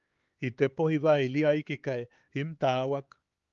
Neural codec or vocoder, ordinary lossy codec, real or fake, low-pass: codec, 16 kHz, 4 kbps, X-Codec, HuBERT features, trained on LibriSpeech; Opus, 32 kbps; fake; 7.2 kHz